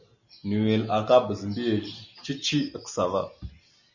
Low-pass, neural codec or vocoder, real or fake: 7.2 kHz; none; real